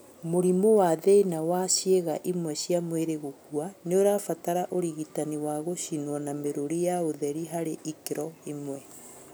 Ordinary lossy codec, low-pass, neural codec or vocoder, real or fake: none; none; none; real